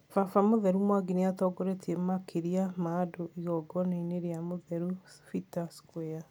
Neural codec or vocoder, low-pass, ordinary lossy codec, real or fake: none; none; none; real